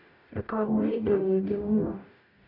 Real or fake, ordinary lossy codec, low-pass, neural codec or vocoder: fake; none; 5.4 kHz; codec, 44.1 kHz, 0.9 kbps, DAC